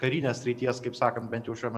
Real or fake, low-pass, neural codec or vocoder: fake; 14.4 kHz; vocoder, 44.1 kHz, 128 mel bands every 256 samples, BigVGAN v2